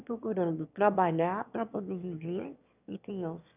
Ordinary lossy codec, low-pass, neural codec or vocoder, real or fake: none; 3.6 kHz; autoencoder, 22.05 kHz, a latent of 192 numbers a frame, VITS, trained on one speaker; fake